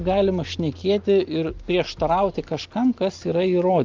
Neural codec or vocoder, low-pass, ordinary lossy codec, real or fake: none; 7.2 kHz; Opus, 32 kbps; real